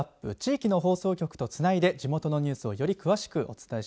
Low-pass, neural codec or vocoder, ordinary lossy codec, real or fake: none; none; none; real